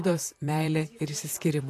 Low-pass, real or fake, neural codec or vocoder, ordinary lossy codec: 14.4 kHz; fake; vocoder, 44.1 kHz, 128 mel bands, Pupu-Vocoder; AAC, 64 kbps